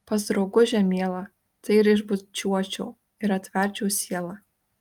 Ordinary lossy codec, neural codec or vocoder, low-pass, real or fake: Opus, 32 kbps; none; 19.8 kHz; real